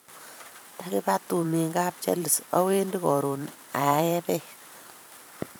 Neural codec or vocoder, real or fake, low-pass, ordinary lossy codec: vocoder, 44.1 kHz, 128 mel bands every 512 samples, BigVGAN v2; fake; none; none